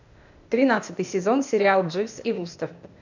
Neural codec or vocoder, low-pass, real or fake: codec, 16 kHz, 0.8 kbps, ZipCodec; 7.2 kHz; fake